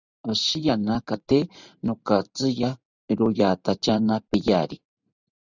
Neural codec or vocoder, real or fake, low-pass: vocoder, 44.1 kHz, 128 mel bands every 512 samples, BigVGAN v2; fake; 7.2 kHz